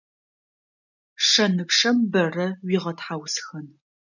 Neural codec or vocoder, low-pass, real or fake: none; 7.2 kHz; real